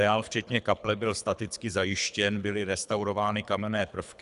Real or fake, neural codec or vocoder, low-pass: fake; codec, 24 kHz, 3 kbps, HILCodec; 10.8 kHz